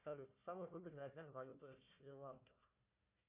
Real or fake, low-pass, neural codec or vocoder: fake; 3.6 kHz; codec, 16 kHz, 1 kbps, FunCodec, trained on Chinese and English, 50 frames a second